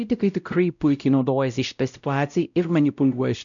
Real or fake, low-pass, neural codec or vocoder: fake; 7.2 kHz; codec, 16 kHz, 0.5 kbps, X-Codec, WavLM features, trained on Multilingual LibriSpeech